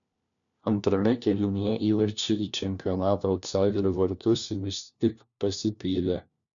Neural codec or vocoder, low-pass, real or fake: codec, 16 kHz, 1 kbps, FunCodec, trained on LibriTTS, 50 frames a second; 7.2 kHz; fake